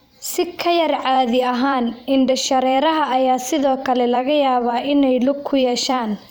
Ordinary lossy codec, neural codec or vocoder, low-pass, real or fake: none; vocoder, 44.1 kHz, 128 mel bands every 512 samples, BigVGAN v2; none; fake